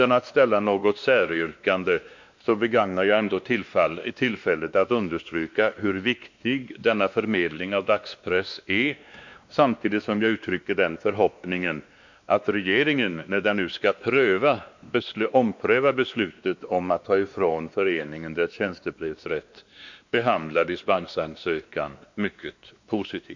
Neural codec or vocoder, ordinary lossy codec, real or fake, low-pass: codec, 16 kHz, 2 kbps, X-Codec, WavLM features, trained on Multilingual LibriSpeech; MP3, 64 kbps; fake; 7.2 kHz